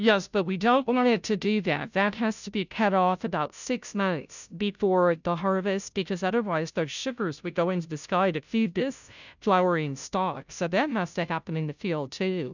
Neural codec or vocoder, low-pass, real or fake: codec, 16 kHz, 0.5 kbps, FunCodec, trained on Chinese and English, 25 frames a second; 7.2 kHz; fake